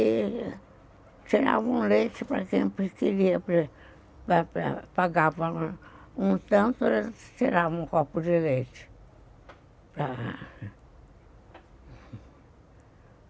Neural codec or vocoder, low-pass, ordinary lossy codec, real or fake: none; none; none; real